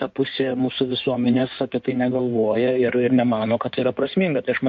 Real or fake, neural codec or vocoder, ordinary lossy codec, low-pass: fake; codec, 16 kHz in and 24 kHz out, 2.2 kbps, FireRedTTS-2 codec; MP3, 48 kbps; 7.2 kHz